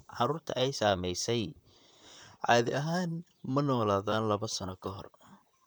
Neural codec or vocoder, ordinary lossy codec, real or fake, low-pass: vocoder, 44.1 kHz, 128 mel bands, Pupu-Vocoder; none; fake; none